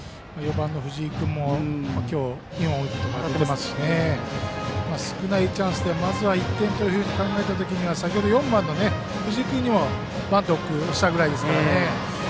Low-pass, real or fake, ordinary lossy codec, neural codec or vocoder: none; real; none; none